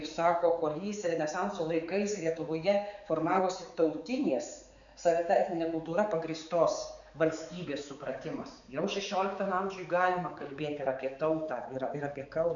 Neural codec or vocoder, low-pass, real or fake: codec, 16 kHz, 4 kbps, X-Codec, HuBERT features, trained on balanced general audio; 7.2 kHz; fake